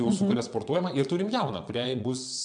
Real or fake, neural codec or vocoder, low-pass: fake; vocoder, 22.05 kHz, 80 mel bands, WaveNeXt; 9.9 kHz